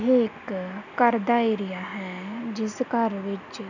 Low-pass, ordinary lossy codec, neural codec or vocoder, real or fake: 7.2 kHz; none; none; real